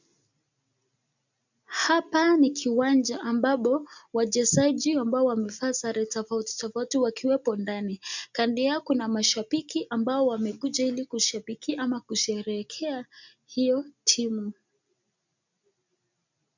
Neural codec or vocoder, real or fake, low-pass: none; real; 7.2 kHz